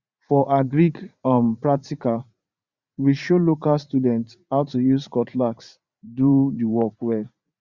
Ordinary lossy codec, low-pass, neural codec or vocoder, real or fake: Opus, 64 kbps; 7.2 kHz; vocoder, 44.1 kHz, 80 mel bands, Vocos; fake